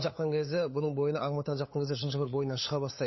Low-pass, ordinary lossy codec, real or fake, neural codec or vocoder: 7.2 kHz; MP3, 24 kbps; fake; codec, 16 kHz, 8 kbps, FunCodec, trained on LibriTTS, 25 frames a second